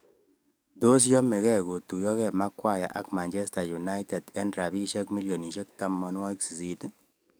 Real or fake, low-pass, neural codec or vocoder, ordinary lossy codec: fake; none; codec, 44.1 kHz, 7.8 kbps, DAC; none